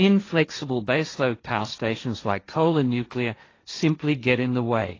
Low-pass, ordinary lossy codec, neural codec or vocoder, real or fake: 7.2 kHz; AAC, 32 kbps; codec, 16 kHz, 1.1 kbps, Voila-Tokenizer; fake